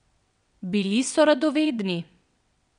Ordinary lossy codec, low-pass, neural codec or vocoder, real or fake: MP3, 96 kbps; 9.9 kHz; vocoder, 22.05 kHz, 80 mel bands, WaveNeXt; fake